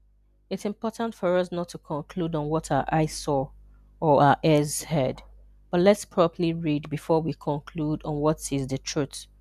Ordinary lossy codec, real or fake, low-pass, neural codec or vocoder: none; real; 14.4 kHz; none